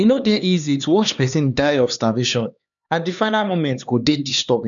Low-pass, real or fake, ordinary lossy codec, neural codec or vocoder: 7.2 kHz; fake; none; codec, 16 kHz, 2 kbps, X-Codec, HuBERT features, trained on LibriSpeech